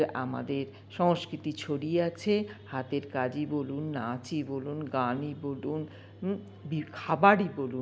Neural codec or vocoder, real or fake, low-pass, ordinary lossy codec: none; real; none; none